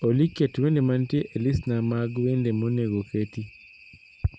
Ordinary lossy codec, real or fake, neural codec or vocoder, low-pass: none; real; none; none